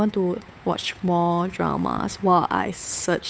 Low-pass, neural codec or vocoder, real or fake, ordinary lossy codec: none; codec, 16 kHz, 8 kbps, FunCodec, trained on Chinese and English, 25 frames a second; fake; none